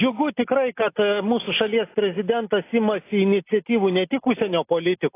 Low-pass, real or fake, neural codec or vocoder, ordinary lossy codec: 3.6 kHz; real; none; AAC, 24 kbps